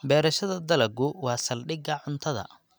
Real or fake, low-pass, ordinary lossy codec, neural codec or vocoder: real; none; none; none